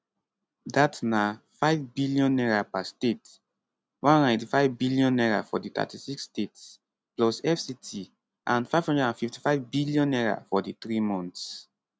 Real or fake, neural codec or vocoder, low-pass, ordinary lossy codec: real; none; none; none